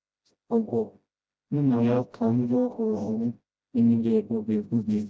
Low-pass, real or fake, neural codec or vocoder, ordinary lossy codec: none; fake; codec, 16 kHz, 0.5 kbps, FreqCodec, smaller model; none